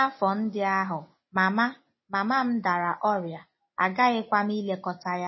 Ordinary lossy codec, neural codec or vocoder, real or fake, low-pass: MP3, 24 kbps; none; real; 7.2 kHz